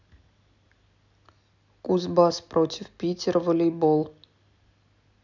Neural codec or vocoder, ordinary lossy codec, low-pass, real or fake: none; none; 7.2 kHz; real